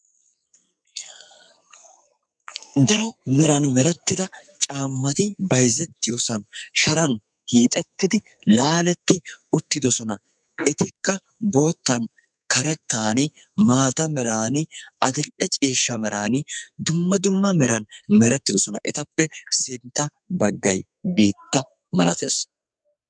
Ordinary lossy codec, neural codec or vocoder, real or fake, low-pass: MP3, 96 kbps; codec, 44.1 kHz, 2.6 kbps, SNAC; fake; 9.9 kHz